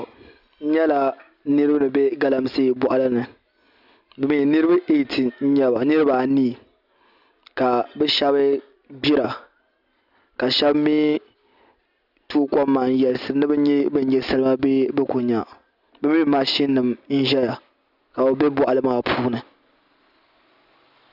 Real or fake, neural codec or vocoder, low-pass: real; none; 5.4 kHz